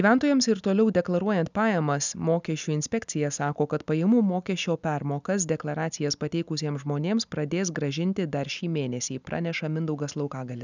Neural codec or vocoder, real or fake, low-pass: none; real; 7.2 kHz